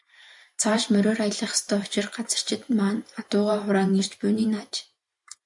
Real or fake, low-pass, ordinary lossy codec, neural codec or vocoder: fake; 10.8 kHz; MP3, 64 kbps; vocoder, 44.1 kHz, 128 mel bands every 512 samples, BigVGAN v2